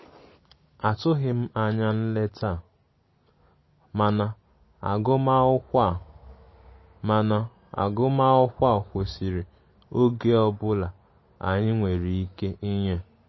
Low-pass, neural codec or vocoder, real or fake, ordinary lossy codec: 7.2 kHz; none; real; MP3, 24 kbps